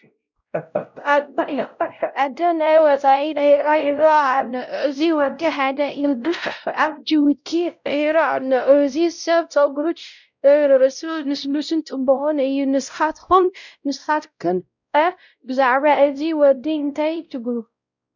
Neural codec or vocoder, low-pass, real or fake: codec, 16 kHz, 0.5 kbps, X-Codec, WavLM features, trained on Multilingual LibriSpeech; 7.2 kHz; fake